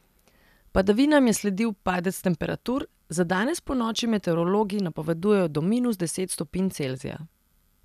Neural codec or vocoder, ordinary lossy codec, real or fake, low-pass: none; none; real; 14.4 kHz